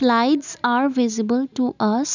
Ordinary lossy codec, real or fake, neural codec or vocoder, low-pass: none; real; none; 7.2 kHz